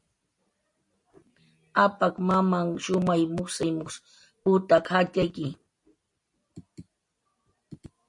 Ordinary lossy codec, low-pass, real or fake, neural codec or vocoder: MP3, 48 kbps; 10.8 kHz; real; none